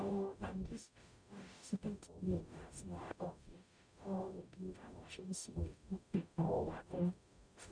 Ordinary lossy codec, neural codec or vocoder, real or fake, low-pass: none; codec, 44.1 kHz, 0.9 kbps, DAC; fake; 9.9 kHz